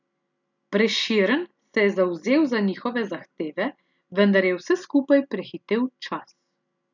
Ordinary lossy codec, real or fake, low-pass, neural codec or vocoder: none; real; 7.2 kHz; none